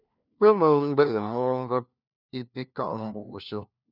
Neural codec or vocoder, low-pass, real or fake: codec, 16 kHz, 1 kbps, FunCodec, trained on LibriTTS, 50 frames a second; 5.4 kHz; fake